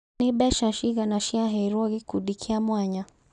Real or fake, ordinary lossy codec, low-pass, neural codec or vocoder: real; none; 9.9 kHz; none